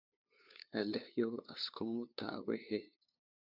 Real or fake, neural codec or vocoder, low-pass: fake; codec, 16 kHz, 2 kbps, FunCodec, trained on LibriTTS, 25 frames a second; 5.4 kHz